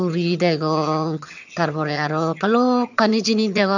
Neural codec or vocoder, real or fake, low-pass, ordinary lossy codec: vocoder, 22.05 kHz, 80 mel bands, HiFi-GAN; fake; 7.2 kHz; none